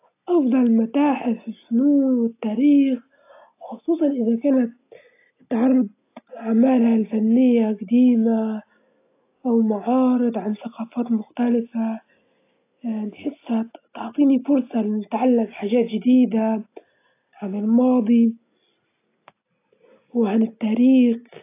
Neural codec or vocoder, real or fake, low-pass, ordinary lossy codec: none; real; 3.6 kHz; AAC, 24 kbps